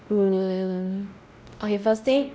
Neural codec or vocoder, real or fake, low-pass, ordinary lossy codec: codec, 16 kHz, 0.5 kbps, X-Codec, WavLM features, trained on Multilingual LibriSpeech; fake; none; none